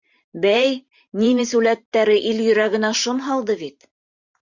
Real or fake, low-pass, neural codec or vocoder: fake; 7.2 kHz; vocoder, 44.1 kHz, 128 mel bands every 512 samples, BigVGAN v2